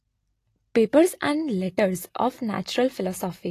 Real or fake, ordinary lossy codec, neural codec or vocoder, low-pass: real; AAC, 48 kbps; none; 14.4 kHz